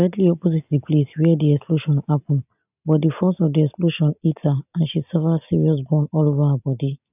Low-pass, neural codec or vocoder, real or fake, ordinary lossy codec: 3.6 kHz; none; real; none